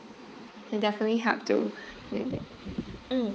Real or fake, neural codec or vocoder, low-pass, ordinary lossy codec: fake; codec, 16 kHz, 4 kbps, X-Codec, HuBERT features, trained on balanced general audio; none; none